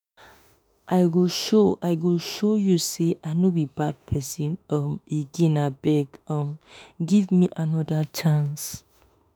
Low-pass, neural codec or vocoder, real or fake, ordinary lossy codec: none; autoencoder, 48 kHz, 32 numbers a frame, DAC-VAE, trained on Japanese speech; fake; none